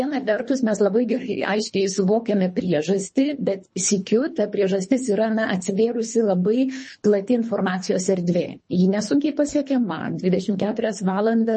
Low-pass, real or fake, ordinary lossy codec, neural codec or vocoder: 10.8 kHz; fake; MP3, 32 kbps; codec, 24 kHz, 3 kbps, HILCodec